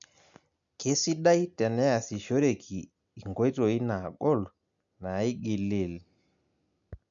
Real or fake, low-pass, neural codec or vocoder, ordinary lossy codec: real; 7.2 kHz; none; none